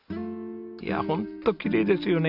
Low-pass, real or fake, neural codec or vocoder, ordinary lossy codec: 5.4 kHz; real; none; none